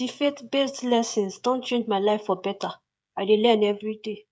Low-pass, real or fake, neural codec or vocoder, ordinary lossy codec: none; fake; codec, 16 kHz, 16 kbps, FreqCodec, smaller model; none